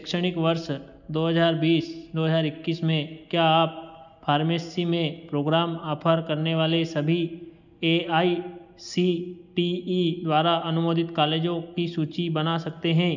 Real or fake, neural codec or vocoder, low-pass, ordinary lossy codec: real; none; 7.2 kHz; none